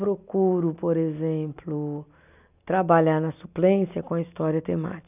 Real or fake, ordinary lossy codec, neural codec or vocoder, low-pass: real; none; none; 3.6 kHz